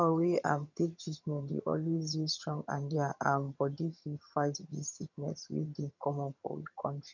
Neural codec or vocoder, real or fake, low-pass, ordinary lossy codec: vocoder, 22.05 kHz, 80 mel bands, HiFi-GAN; fake; 7.2 kHz; none